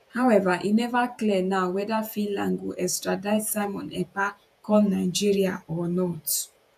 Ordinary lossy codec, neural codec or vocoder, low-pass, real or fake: AAC, 96 kbps; vocoder, 48 kHz, 128 mel bands, Vocos; 14.4 kHz; fake